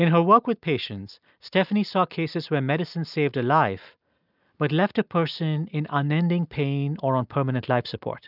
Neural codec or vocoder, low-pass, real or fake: none; 5.4 kHz; real